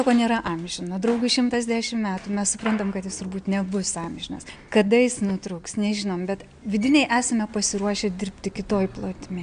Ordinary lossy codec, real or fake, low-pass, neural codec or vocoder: MP3, 96 kbps; real; 9.9 kHz; none